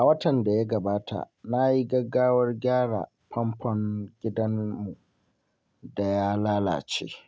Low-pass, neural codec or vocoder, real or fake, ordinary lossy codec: none; none; real; none